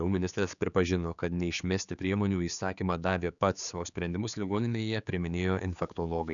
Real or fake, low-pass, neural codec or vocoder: fake; 7.2 kHz; codec, 16 kHz, 4 kbps, X-Codec, HuBERT features, trained on general audio